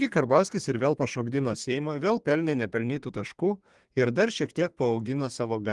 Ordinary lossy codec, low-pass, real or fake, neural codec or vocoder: Opus, 24 kbps; 10.8 kHz; fake; codec, 32 kHz, 1.9 kbps, SNAC